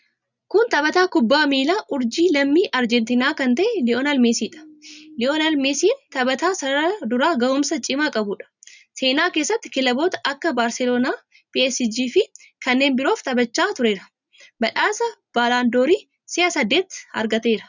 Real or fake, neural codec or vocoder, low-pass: real; none; 7.2 kHz